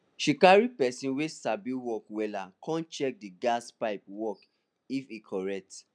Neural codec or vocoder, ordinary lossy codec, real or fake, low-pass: none; none; real; 9.9 kHz